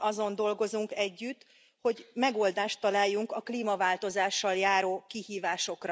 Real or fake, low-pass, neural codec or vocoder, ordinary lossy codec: real; none; none; none